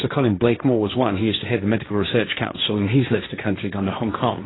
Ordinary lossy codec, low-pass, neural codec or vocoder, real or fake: AAC, 16 kbps; 7.2 kHz; codec, 16 kHz, 1.1 kbps, Voila-Tokenizer; fake